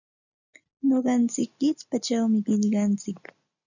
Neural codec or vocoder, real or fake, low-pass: none; real; 7.2 kHz